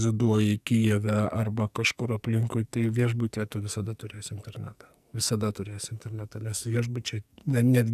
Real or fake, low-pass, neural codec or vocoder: fake; 14.4 kHz; codec, 44.1 kHz, 2.6 kbps, SNAC